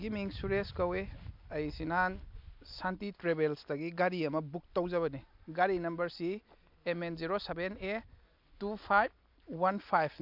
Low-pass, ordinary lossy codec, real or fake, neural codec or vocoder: 5.4 kHz; MP3, 48 kbps; real; none